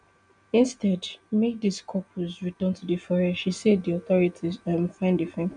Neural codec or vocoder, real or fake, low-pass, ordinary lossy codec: none; real; 9.9 kHz; none